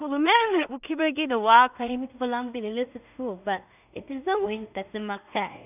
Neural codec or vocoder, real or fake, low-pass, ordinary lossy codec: codec, 16 kHz in and 24 kHz out, 0.4 kbps, LongCat-Audio-Codec, two codebook decoder; fake; 3.6 kHz; none